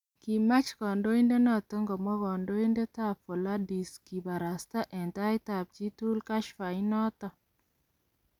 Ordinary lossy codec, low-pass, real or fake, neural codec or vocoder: none; 19.8 kHz; real; none